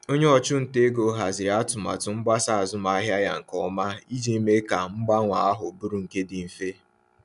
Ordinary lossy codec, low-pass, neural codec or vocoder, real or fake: none; 10.8 kHz; none; real